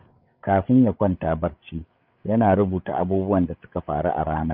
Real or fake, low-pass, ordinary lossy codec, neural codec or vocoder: fake; 5.4 kHz; none; codec, 16 kHz, 8 kbps, FreqCodec, larger model